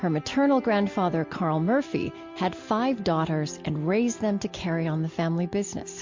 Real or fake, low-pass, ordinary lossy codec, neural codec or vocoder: real; 7.2 kHz; MP3, 48 kbps; none